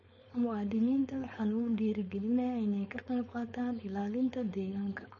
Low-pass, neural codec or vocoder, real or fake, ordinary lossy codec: 7.2 kHz; codec, 16 kHz, 4.8 kbps, FACodec; fake; MP3, 32 kbps